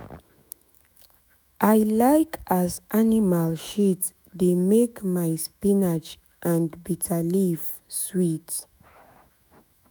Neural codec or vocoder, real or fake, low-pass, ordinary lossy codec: autoencoder, 48 kHz, 128 numbers a frame, DAC-VAE, trained on Japanese speech; fake; none; none